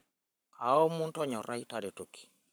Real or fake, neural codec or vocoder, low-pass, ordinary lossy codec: fake; codec, 44.1 kHz, 7.8 kbps, Pupu-Codec; none; none